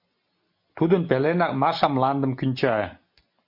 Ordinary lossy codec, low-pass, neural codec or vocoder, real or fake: MP3, 32 kbps; 5.4 kHz; none; real